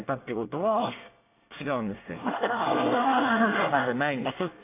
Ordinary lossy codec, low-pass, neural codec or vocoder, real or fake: AAC, 24 kbps; 3.6 kHz; codec, 24 kHz, 1 kbps, SNAC; fake